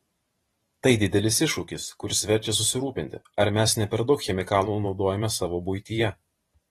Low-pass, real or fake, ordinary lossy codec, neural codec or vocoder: 19.8 kHz; fake; AAC, 32 kbps; vocoder, 44.1 kHz, 128 mel bands every 512 samples, BigVGAN v2